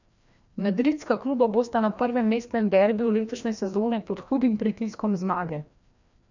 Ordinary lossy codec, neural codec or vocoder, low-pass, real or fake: none; codec, 16 kHz, 1 kbps, FreqCodec, larger model; 7.2 kHz; fake